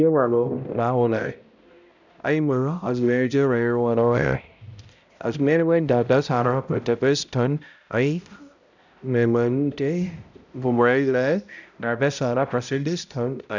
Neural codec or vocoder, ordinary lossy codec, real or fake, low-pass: codec, 16 kHz, 0.5 kbps, X-Codec, HuBERT features, trained on balanced general audio; none; fake; 7.2 kHz